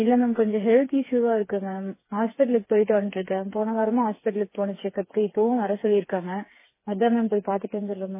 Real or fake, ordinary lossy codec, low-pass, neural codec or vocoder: fake; MP3, 16 kbps; 3.6 kHz; codec, 16 kHz, 4 kbps, FreqCodec, smaller model